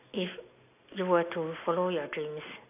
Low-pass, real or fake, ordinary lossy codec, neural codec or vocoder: 3.6 kHz; real; AAC, 24 kbps; none